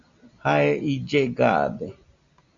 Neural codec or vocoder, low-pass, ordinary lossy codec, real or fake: none; 7.2 kHz; Opus, 64 kbps; real